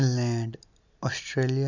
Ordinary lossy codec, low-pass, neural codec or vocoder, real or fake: none; 7.2 kHz; none; real